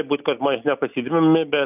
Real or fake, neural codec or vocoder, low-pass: real; none; 3.6 kHz